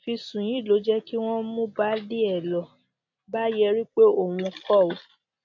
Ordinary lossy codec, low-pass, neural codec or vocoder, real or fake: MP3, 48 kbps; 7.2 kHz; none; real